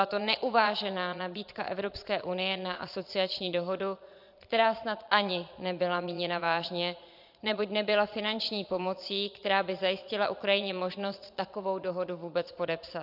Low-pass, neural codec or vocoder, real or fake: 5.4 kHz; vocoder, 22.05 kHz, 80 mel bands, Vocos; fake